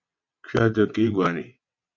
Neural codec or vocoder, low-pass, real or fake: vocoder, 22.05 kHz, 80 mel bands, WaveNeXt; 7.2 kHz; fake